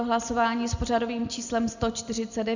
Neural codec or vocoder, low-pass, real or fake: none; 7.2 kHz; real